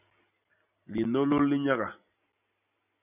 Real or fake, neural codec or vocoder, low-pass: real; none; 3.6 kHz